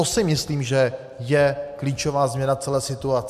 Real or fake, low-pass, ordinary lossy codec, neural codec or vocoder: real; 14.4 kHz; MP3, 96 kbps; none